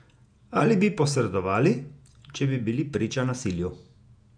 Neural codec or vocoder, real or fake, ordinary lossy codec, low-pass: vocoder, 48 kHz, 128 mel bands, Vocos; fake; none; 9.9 kHz